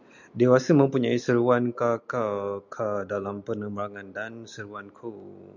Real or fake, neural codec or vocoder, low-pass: real; none; 7.2 kHz